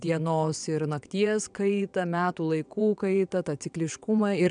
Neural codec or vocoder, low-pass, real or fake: vocoder, 22.05 kHz, 80 mel bands, WaveNeXt; 9.9 kHz; fake